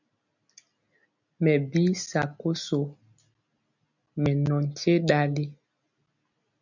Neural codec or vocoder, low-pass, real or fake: none; 7.2 kHz; real